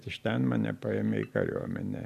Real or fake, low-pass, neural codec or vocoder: real; 14.4 kHz; none